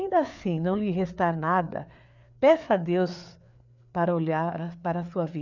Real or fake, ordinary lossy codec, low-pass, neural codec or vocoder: fake; none; 7.2 kHz; codec, 16 kHz, 4 kbps, FreqCodec, larger model